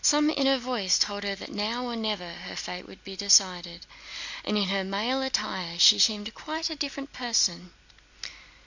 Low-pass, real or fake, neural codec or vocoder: 7.2 kHz; real; none